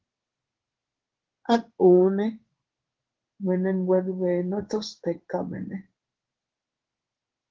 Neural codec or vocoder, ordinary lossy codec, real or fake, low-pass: codec, 16 kHz in and 24 kHz out, 1 kbps, XY-Tokenizer; Opus, 16 kbps; fake; 7.2 kHz